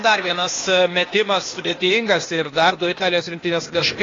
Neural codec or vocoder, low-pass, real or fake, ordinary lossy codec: codec, 16 kHz, 0.8 kbps, ZipCodec; 7.2 kHz; fake; AAC, 32 kbps